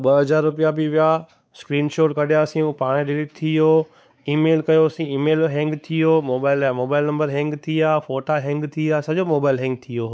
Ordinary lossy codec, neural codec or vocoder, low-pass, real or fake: none; codec, 16 kHz, 4 kbps, X-Codec, WavLM features, trained on Multilingual LibriSpeech; none; fake